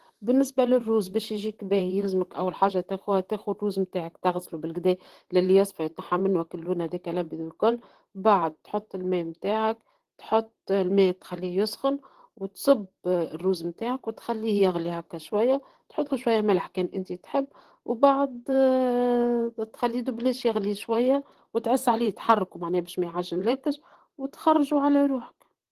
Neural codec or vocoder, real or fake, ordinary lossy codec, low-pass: vocoder, 44.1 kHz, 128 mel bands, Pupu-Vocoder; fake; Opus, 16 kbps; 14.4 kHz